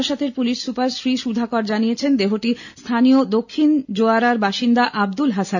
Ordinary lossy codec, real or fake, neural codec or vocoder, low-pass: none; real; none; 7.2 kHz